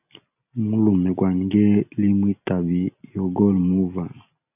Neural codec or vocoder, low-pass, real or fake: none; 3.6 kHz; real